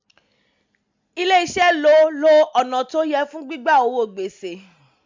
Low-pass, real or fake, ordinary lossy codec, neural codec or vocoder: 7.2 kHz; real; MP3, 64 kbps; none